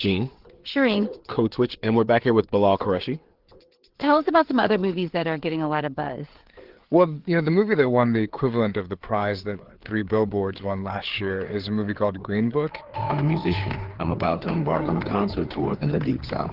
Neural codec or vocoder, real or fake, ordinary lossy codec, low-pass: codec, 16 kHz, 4 kbps, FreqCodec, larger model; fake; Opus, 16 kbps; 5.4 kHz